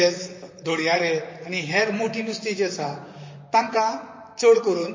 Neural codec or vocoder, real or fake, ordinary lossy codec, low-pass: vocoder, 44.1 kHz, 128 mel bands, Pupu-Vocoder; fake; MP3, 32 kbps; 7.2 kHz